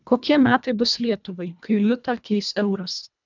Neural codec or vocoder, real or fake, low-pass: codec, 24 kHz, 1.5 kbps, HILCodec; fake; 7.2 kHz